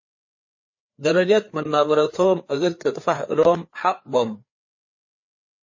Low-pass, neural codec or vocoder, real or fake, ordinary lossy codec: 7.2 kHz; codec, 16 kHz, 4 kbps, FreqCodec, larger model; fake; MP3, 32 kbps